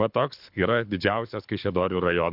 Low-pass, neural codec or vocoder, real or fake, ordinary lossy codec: 5.4 kHz; codec, 24 kHz, 6 kbps, HILCodec; fake; MP3, 48 kbps